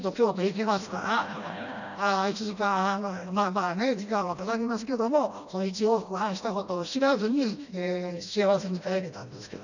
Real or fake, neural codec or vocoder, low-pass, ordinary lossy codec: fake; codec, 16 kHz, 1 kbps, FreqCodec, smaller model; 7.2 kHz; none